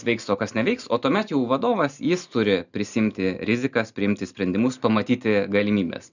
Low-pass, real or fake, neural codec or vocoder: 7.2 kHz; real; none